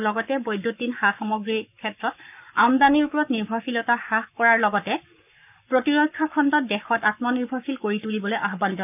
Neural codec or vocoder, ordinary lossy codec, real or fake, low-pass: codec, 44.1 kHz, 7.8 kbps, Pupu-Codec; none; fake; 3.6 kHz